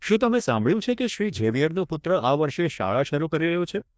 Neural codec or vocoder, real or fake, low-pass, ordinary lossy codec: codec, 16 kHz, 1 kbps, FreqCodec, larger model; fake; none; none